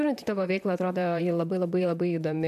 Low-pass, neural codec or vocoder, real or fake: 14.4 kHz; vocoder, 44.1 kHz, 128 mel bands, Pupu-Vocoder; fake